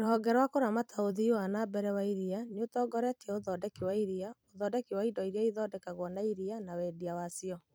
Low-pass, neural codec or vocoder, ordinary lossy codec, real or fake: none; vocoder, 44.1 kHz, 128 mel bands every 256 samples, BigVGAN v2; none; fake